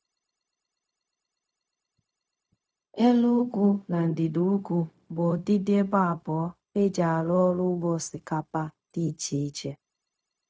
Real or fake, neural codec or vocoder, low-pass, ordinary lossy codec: fake; codec, 16 kHz, 0.4 kbps, LongCat-Audio-Codec; none; none